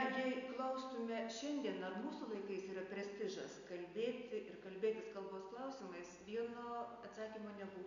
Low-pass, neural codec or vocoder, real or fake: 7.2 kHz; none; real